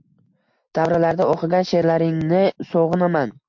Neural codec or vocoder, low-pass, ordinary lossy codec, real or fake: none; 7.2 kHz; MP3, 48 kbps; real